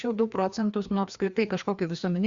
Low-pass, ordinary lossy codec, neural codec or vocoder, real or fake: 7.2 kHz; Opus, 64 kbps; codec, 16 kHz, 2 kbps, FreqCodec, larger model; fake